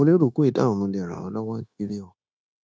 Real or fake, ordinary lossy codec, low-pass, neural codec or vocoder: fake; none; none; codec, 16 kHz, 0.9 kbps, LongCat-Audio-Codec